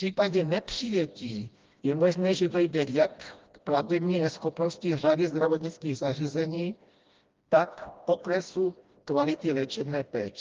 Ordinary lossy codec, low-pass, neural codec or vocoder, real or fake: Opus, 32 kbps; 7.2 kHz; codec, 16 kHz, 1 kbps, FreqCodec, smaller model; fake